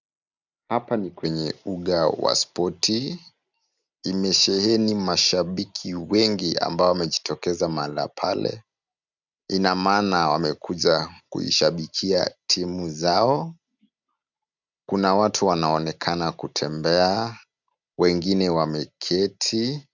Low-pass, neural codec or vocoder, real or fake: 7.2 kHz; none; real